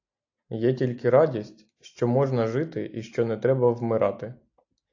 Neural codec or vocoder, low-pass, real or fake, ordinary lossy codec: none; 7.2 kHz; real; AAC, 48 kbps